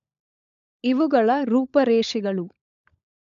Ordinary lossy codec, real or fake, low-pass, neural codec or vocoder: none; fake; 7.2 kHz; codec, 16 kHz, 16 kbps, FunCodec, trained on LibriTTS, 50 frames a second